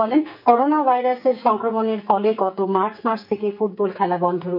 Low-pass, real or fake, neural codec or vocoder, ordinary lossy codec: 5.4 kHz; fake; codec, 44.1 kHz, 2.6 kbps, SNAC; none